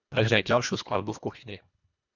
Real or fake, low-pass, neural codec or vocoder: fake; 7.2 kHz; codec, 24 kHz, 1.5 kbps, HILCodec